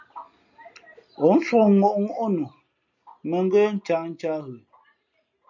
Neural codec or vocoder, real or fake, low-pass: none; real; 7.2 kHz